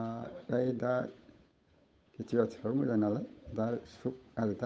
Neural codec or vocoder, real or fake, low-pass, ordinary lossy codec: codec, 16 kHz, 8 kbps, FunCodec, trained on Chinese and English, 25 frames a second; fake; none; none